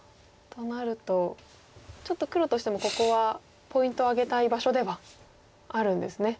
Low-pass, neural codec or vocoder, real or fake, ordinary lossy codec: none; none; real; none